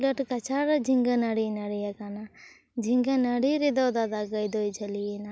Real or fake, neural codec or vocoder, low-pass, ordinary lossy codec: real; none; none; none